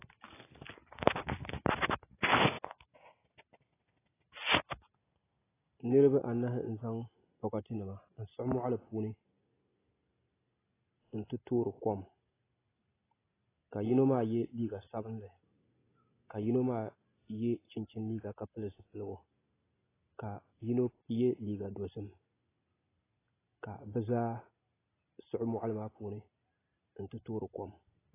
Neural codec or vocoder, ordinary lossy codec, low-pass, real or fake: none; AAC, 16 kbps; 3.6 kHz; real